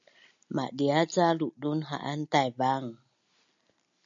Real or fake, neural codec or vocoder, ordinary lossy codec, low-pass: real; none; AAC, 64 kbps; 7.2 kHz